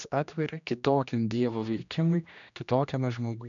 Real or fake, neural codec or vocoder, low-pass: fake; codec, 16 kHz, 1 kbps, X-Codec, HuBERT features, trained on general audio; 7.2 kHz